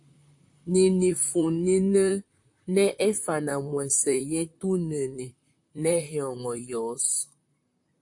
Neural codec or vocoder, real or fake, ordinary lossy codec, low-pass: vocoder, 44.1 kHz, 128 mel bands, Pupu-Vocoder; fake; AAC, 64 kbps; 10.8 kHz